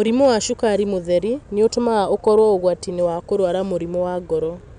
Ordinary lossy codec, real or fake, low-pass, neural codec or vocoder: none; real; 9.9 kHz; none